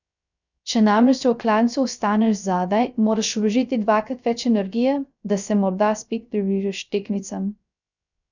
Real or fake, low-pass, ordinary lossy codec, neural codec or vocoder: fake; 7.2 kHz; none; codec, 16 kHz, 0.3 kbps, FocalCodec